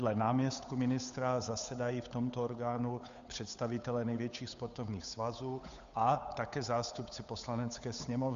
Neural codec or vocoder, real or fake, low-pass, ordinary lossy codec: codec, 16 kHz, 8 kbps, FunCodec, trained on Chinese and English, 25 frames a second; fake; 7.2 kHz; Opus, 64 kbps